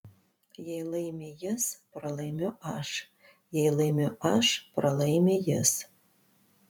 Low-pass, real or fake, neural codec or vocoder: 19.8 kHz; fake; vocoder, 44.1 kHz, 128 mel bands every 512 samples, BigVGAN v2